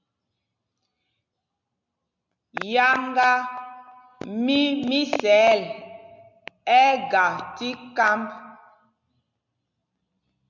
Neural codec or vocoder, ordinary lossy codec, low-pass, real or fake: none; MP3, 64 kbps; 7.2 kHz; real